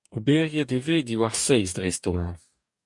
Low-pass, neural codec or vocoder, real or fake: 10.8 kHz; codec, 44.1 kHz, 2.6 kbps, DAC; fake